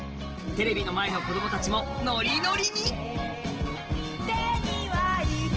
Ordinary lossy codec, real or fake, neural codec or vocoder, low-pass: Opus, 16 kbps; real; none; 7.2 kHz